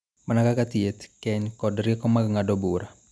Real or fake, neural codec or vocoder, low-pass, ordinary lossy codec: real; none; none; none